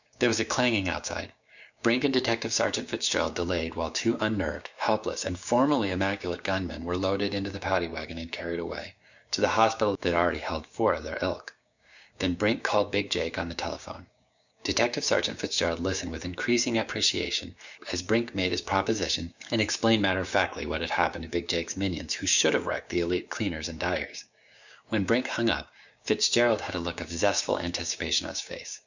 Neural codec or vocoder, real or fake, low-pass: codec, 16 kHz, 6 kbps, DAC; fake; 7.2 kHz